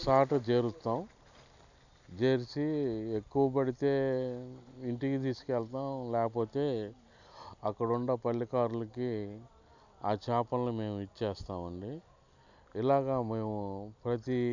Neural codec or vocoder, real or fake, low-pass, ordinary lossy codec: none; real; 7.2 kHz; none